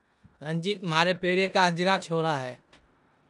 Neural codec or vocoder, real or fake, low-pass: codec, 16 kHz in and 24 kHz out, 0.9 kbps, LongCat-Audio-Codec, four codebook decoder; fake; 10.8 kHz